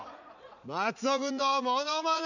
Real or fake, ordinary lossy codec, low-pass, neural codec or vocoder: fake; none; 7.2 kHz; vocoder, 44.1 kHz, 80 mel bands, Vocos